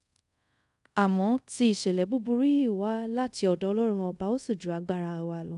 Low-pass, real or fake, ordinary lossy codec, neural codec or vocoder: 10.8 kHz; fake; none; codec, 24 kHz, 0.5 kbps, DualCodec